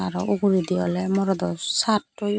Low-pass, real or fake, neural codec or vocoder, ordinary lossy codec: none; real; none; none